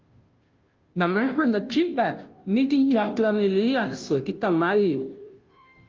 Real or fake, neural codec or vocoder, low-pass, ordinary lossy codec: fake; codec, 16 kHz, 0.5 kbps, FunCodec, trained on Chinese and English, 25 frames a second; 7.2 kHz; Opus, 32 kbps